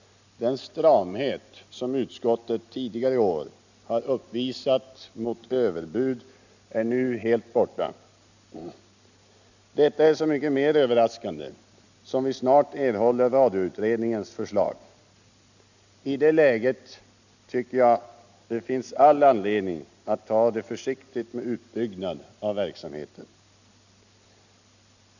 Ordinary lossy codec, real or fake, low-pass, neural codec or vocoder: none; real; 7.2 kHz; none